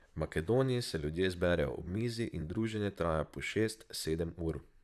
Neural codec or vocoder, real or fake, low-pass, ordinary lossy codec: vocoder, 44.1 kHz, 128 mel bands, Pupu-Vocoder; fake; 14.4 kHz; none